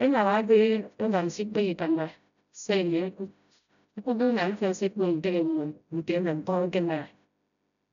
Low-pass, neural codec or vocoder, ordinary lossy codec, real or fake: 7.2 kHz; codec, 16 kHz, 0.5 kbps, FreqCodec, smaller model; none; fake